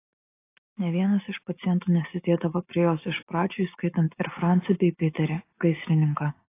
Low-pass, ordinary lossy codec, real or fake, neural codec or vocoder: 3.6 kHz; AAC, 24 kbps; real; none